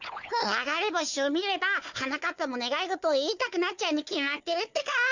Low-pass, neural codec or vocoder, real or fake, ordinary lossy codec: 7.2 kHz; codec, 16 kHz, 4 kbps, FunCodec, trained on Chinese and English, 50 frames a second; fake; none